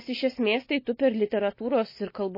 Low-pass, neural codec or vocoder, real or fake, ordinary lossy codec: 5.4 kHz; none; real; MP3, 24 kbps